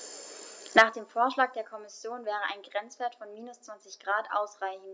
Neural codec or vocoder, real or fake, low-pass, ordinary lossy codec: none; real; none; none